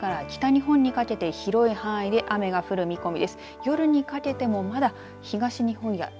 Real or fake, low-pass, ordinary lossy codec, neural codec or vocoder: real; none; none; none